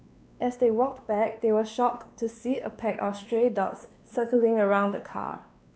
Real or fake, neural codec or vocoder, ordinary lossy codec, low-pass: fake; codec, 16 kHz, 2 kbps, X-Codec, WavLM features, trained on Multilingual LibriSpeech; none; none